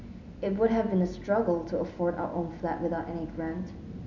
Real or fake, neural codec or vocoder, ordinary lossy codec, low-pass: real; none; none; 7.2 kHz